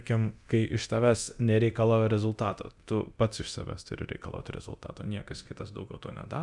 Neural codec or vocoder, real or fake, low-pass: codec, 24 kHz, 0.9 kbps, DualCodec; fake; 10.8 kHz